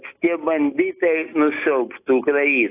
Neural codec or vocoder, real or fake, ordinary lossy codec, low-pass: none; real; AAC, 24 kbps; 3.6 kHz